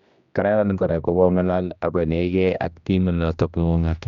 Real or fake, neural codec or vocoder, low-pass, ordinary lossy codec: fake; codec, 16 kHz, 1 kbps, X-Codec, HuBERT features, trained on general audio; 7.2 kHz; Opus, 64 kbps